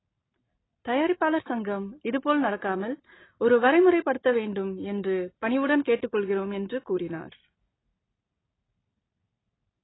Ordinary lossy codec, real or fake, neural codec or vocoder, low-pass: AAC, 16 kbps; real; none; 7.2 kHz